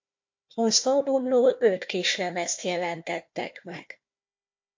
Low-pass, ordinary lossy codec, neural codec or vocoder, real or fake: 7.2 kHz; MP3, 48 kbps; codec, 16 kHz, 1 kbps, FunCodec, trained on Chinese and English, 50 frames a second; fake